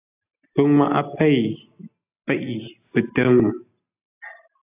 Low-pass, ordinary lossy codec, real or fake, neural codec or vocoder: 3.6 kHz; AAC, 32 kbps; real; none